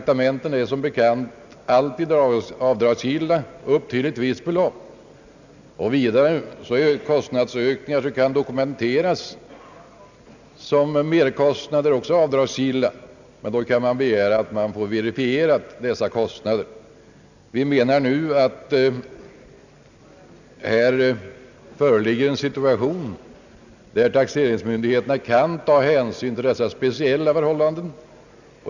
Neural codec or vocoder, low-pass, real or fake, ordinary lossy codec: none; 7.2 kHz; real; none